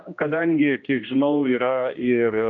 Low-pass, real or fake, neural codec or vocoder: 7.2 kHz; fake; codec, 16 kHz, 1 kbps, X-Codec, HuBERT features, trained on general audio